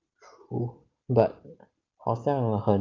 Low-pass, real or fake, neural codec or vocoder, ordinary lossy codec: 7.2 kHz; real; none; Opus, 32 kbps